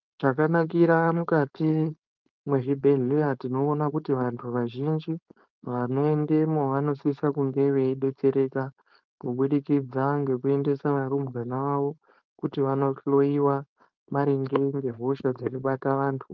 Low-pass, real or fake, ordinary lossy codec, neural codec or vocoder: 7.2 kHz; fake; Opus, 32 kbps; codec, 16 kHz, 4.8 kbps, FACodec